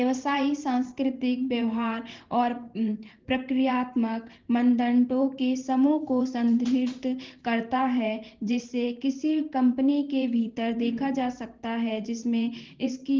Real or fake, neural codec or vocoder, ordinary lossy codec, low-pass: fake; vocoder, 44.1 kHz, 128 mel bands every 512 samples, BigVGAN v2; Opus, 16 kbps; 7.2 kHz